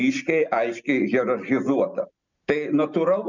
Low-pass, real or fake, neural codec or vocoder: 7.2 kHz; real; none